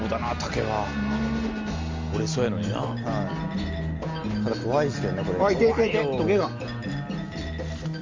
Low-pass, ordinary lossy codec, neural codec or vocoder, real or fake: 7.2 kHz; Opus, 32 kbps; none; real